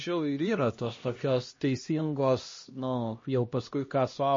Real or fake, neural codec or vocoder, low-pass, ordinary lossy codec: fake; codec, 16 kHz, 1 kbps, X-Codec, HuBERT features, trained on LibriSpeech; 7.2 kHz; MP3, 32 kbps